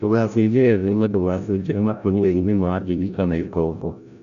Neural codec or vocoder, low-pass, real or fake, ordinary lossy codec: codec, 16 kHz, 0.5 kbps, FreqCodec, larger model; 7.2 kHz; fake; none